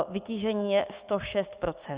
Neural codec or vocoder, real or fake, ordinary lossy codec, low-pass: codec, 16 kHz, 6 kbps, DAC; fake; Opus, 64 kbps; 3.6 kHz